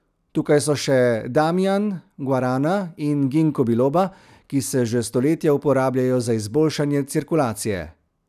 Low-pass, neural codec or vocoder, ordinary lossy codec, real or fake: 14.4 kHz; none; none; real